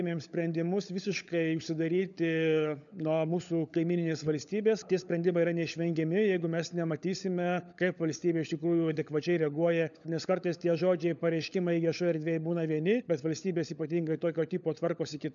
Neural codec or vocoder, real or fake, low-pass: codec, 16 kHz, 16 kbps, FunCodec, trained on LibriTTS, 50 frames a second; fake; 7.2 kHz